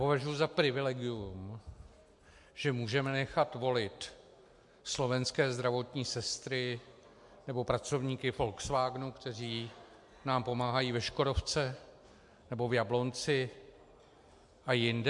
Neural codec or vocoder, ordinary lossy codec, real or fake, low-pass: none; MP3, 64 kbps; real; 10.8 kHz